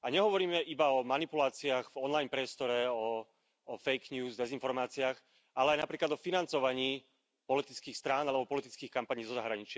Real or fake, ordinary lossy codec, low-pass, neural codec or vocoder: real; none; none; none